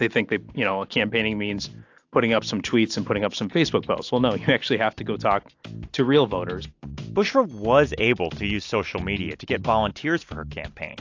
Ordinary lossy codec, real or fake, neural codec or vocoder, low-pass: AAC, 48 kbps; real; none; 7.2 kHz